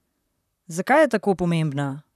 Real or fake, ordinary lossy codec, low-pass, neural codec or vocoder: fake; none; 14.4 kHz; vocoder, 44.1 kHz, 128 mel bands, Pupu-Vocoder